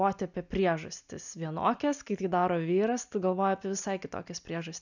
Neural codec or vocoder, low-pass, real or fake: none; 7.2 kHz; real